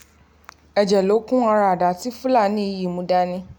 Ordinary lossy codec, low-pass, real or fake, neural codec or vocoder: none; none; real; none